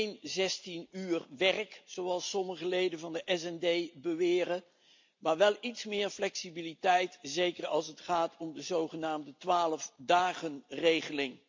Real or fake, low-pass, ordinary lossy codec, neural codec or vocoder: real; 7.2 kHz; MP3, 48 kbps; none